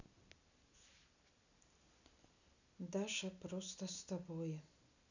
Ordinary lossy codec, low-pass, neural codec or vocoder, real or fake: none; 7.2 kHz; none; real